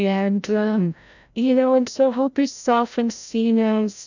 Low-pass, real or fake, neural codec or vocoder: 7.2 kHz; fake; codec, 16 kHz, 0.5 kbps, FreqCodec, larger model